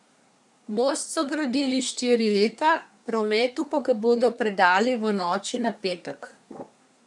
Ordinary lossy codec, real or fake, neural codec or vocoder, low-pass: none; fake; codec, 24 kHz, 1 kbps, SNAC; 10.8 kHz